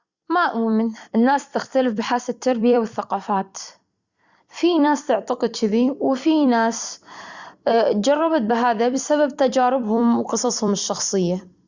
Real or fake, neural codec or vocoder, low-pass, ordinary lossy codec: fake; vocoder, 44.1 kHz, 128 mel bands every 256 samples, BigVGAN v2; 7.2 kHz; Opus, 64 kbps